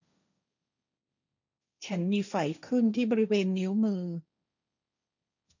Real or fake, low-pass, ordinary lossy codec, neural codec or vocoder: fake; 7.2 kHz; none; codec, 16 kHz, 1.1 kbps, Voila-Tokenizer